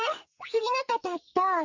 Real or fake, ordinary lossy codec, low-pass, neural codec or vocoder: fake; Opus, 64 kbps; 7.2 kHz; codec, 44.1 kHz, 2.6 kbps, SNAC